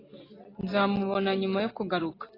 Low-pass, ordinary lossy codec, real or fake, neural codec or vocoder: 5.4 kHz; AAC, 24 kbps; real; none